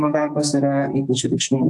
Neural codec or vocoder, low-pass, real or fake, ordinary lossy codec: codec, 44.1 kHz, 2.6 kbps, SNAC; 10.8 kHz; fake; AAC, 64 kbps